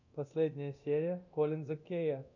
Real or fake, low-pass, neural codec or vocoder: fake; 7.2 kHz; codec, 24 kHz, 0.9 kbps, DualCodec